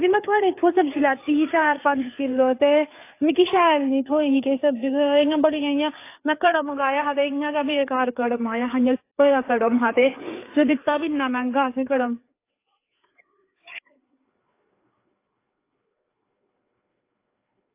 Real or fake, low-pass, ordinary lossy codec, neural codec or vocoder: fake; 3.6 kHz; AAC, 24 kbps; codec, 16 kHz, 4 kbps, FreqCodec, larger model